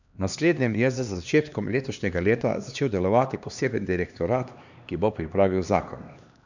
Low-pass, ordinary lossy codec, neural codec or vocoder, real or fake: 7.2 kHz; none; codec, 16 kHz, 2 kbps, X-Codec, HuBERT features, trained on LibriSpeech; fake